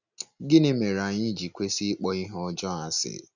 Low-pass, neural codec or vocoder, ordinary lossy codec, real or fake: 7.2 kHz; none; none; real